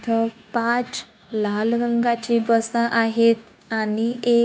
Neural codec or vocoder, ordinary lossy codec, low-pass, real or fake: codec, 16 kHz, 0.9 kbps, LongCat-Audio-Codec; none; none; fake